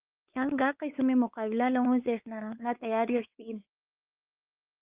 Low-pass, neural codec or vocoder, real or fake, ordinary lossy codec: 3.6 kHz; codec, 16 kHz, 4 kbps, X-Codec, WavLM features, trained on Multilingual LibriSpeech; fake; Opus, 24 kbps